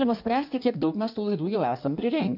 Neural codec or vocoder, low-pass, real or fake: codec, 16 kHz in and 24 kHz out, 1.1 kbps, FireRedTTS-2 codec; 5.4 kHz; fake